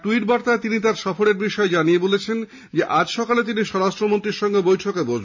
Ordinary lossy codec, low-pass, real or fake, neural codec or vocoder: MP3, 48 kbps; 7.2 kHz; real; none